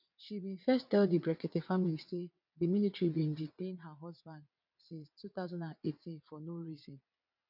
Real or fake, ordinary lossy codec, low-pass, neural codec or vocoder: fake; none; 5.4 kHz; vocoder, 22.05 kHz, 80 mel bands, WaveNeXt